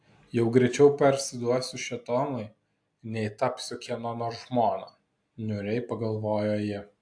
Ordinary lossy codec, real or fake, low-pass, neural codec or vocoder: AAC, 64 kbps; real; 9.9 kHz; none